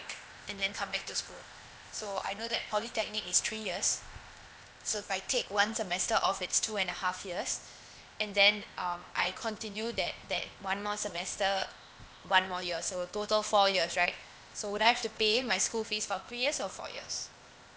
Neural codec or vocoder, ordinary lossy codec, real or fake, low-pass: codec, 16 kHz, 0.8 kbps, ZipCodec; none; fake; none